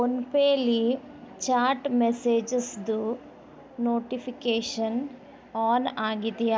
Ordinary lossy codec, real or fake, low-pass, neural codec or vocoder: none; real; none; none